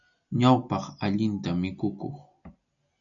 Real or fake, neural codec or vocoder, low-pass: real; none; 7.2 kHz